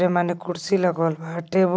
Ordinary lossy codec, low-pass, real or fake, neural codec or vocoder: none; none; real; none